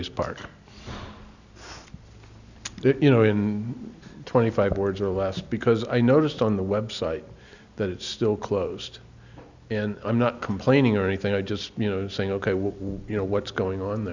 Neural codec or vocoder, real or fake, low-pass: none; real; 7.2 kHz